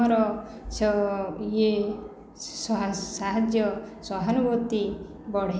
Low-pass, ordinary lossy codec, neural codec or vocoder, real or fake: none; none; none; real